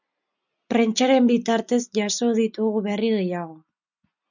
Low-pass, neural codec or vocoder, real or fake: 7.2 kHz; none; real